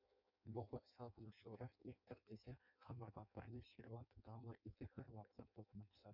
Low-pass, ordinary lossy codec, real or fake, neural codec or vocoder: 5.4 kHz; MP3, 48 kbps; fake; codec, 16 kHz in and 24 kHz out, 0.6 kbps, FireRedTTS-2 codec